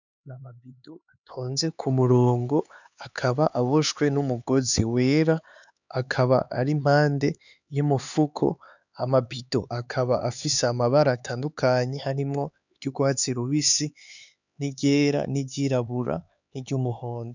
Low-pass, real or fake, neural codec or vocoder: 7.2 kHz; fake; codec, 16 kHz, 4 kbps, X-Codec, HuBERT features, trained on LibriSpeech